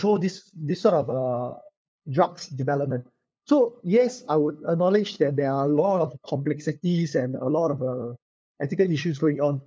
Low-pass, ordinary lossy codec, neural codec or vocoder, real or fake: none; none; codec, 16 kHz, 2 kbps, FunCodec, trained on LibriTTS, 25 frames a second; fake